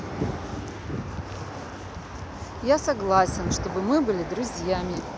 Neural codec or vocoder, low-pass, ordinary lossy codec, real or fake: none; none; none; real